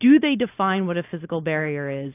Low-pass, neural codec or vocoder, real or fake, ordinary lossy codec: 3.6 kHz; none; real; AAC, 24 kbps